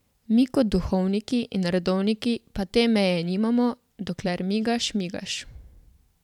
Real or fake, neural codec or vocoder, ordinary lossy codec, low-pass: fake; vocoder, 44.1 kHz, 128 mel bands every 512 samples, BigVGAN v2; none; 19.8 kHz